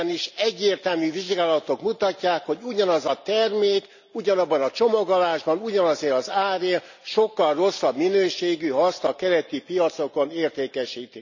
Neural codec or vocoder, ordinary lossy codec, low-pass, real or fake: none; none; 7.2 kHz; real